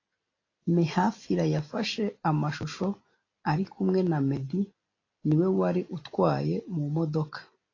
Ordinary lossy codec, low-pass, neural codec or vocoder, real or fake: AAC, 32 kbps; 7.2 kHz; none; real